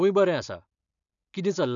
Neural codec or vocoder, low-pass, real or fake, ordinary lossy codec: none; 7.2 kHz; real; none